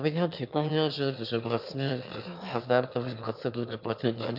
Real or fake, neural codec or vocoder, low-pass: fake; autoencoder, 22.05 kHz, a latent of 192 numbers a frame, VITS, trained on one speaker; 5.4 kHz